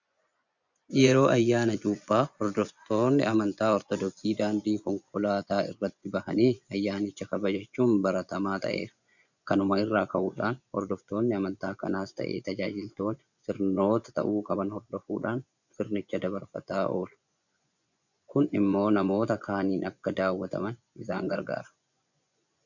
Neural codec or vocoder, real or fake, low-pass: vocoder, 24 kHz, 100 mel bands, Vocos; fake; 7.2 kHz